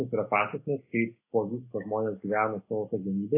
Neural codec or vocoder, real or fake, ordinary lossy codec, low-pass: none; real; AAC, 24 kbps; 3.6 kHz